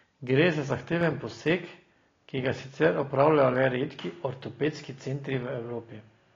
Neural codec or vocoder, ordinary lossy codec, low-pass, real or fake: none; AAC, 32 kbps; 7.2 kHz; real